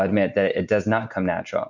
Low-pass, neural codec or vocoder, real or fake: 7.2 kHz; none; real